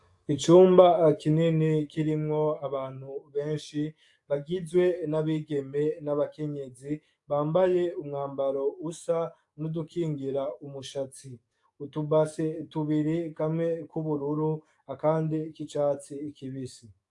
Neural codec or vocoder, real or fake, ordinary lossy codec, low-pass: codec, 44.1 kHz, 7.8 kbps, DAC; fake; AAC, 64 kbps; 10.8 kHz